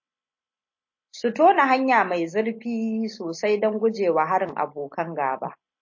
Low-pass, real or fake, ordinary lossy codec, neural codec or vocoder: 7.2 kHz; real; MP3, 32 kbps; none